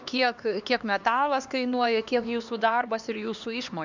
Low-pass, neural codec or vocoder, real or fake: 7.2 kHz; codec, 16 kHz, 2 kbps, X-Codec, HuBERT features, trained on LibriSpeech; fake